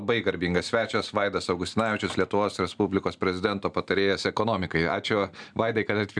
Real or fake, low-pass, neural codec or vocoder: real; 9.9 kHz; none